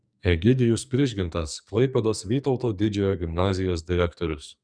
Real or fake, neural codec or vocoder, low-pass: fake; codec, 44.1 kHz, 2.6 kbps, SNAC; 9.9 kHz